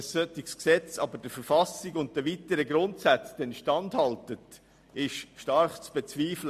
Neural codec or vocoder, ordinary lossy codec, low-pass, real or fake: none; MP3, 64 kbps; 14.4 kHz; real